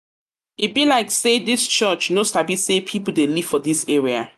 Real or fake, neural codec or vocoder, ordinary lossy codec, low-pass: real; none; none; 14.4 kHz